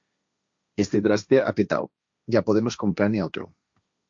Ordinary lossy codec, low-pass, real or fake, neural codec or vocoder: MP3, 48 kbps; 7.2 kHz; fake; codec, 16 kHz, 1.1 kbps, Voila-Tokenizer